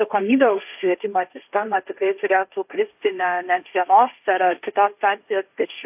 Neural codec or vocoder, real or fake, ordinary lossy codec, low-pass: codec, 16 kHz, 1.1 kbps, Voila-Tokenizer; fake; AAC, 32 kbps; 3.6 kHz